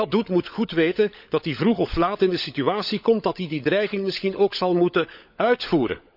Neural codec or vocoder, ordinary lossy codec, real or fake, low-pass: codec, 16 kHz, 16 kbps, FunCodec, trained on LibriTTS, 50 frames a second; none; fake; 5.4 kHz